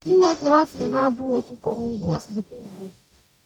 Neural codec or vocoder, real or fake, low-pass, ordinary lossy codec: codec, 44.1 kHz, 0.9 kbps, DAC; fake; 19.8 kHz; none